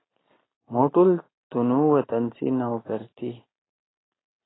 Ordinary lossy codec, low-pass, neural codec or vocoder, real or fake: AAC, 16 kbps; 7.2 kHz; none; real